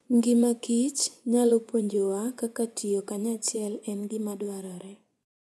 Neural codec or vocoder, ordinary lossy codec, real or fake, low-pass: vocoder, 24 kHz, 100 mel bands, Vocos; none; fake; none